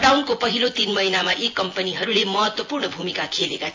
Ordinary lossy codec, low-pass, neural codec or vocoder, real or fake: AAC, 48 kbps; 7.2 kHz; vocoder, 24 kHz, 100 mel bands, Vocos; fake